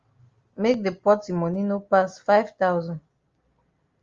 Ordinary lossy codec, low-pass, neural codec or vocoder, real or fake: Opus, 32 kbps; 7.2 kHz; none; real